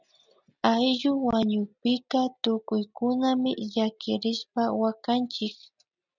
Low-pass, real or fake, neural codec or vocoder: 7.2 kHz; real; none